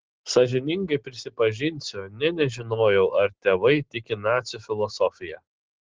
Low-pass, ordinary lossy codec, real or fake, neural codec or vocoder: 7.2 kHz; Opus, 16 kbps; real; none